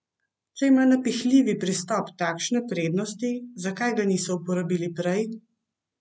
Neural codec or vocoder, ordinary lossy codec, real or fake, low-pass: none; none; real; none